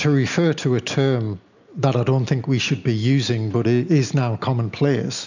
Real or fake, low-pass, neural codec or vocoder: real; 7.2 kHz; none